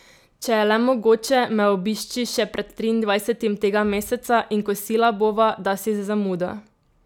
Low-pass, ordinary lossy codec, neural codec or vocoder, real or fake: 19.8 kHz; none; none; real